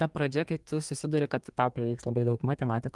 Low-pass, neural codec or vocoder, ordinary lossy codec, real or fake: 10.8 kHz; codec, 32 kHz, 1.9 kbps, SNAC; Opus, 24 kbps; fake